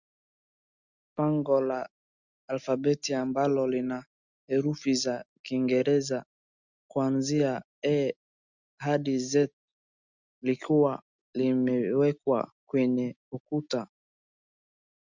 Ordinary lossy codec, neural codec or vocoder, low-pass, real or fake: Opus, 64 kbps; none; 7.2 kHz; real